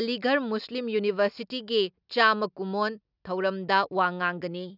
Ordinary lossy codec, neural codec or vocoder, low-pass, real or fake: none; none; 5.4 kHz; real